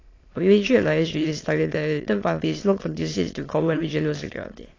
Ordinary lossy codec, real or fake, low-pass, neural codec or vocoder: AAC, 32 kbps; fake; 7.2 kHz; autoencoder, 22.05 kHz, a latent of 192 numbers a frame, VITS, trained on many speakers